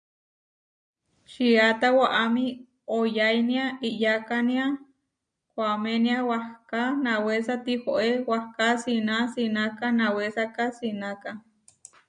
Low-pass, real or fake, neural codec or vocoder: 9.9 kHz; real; none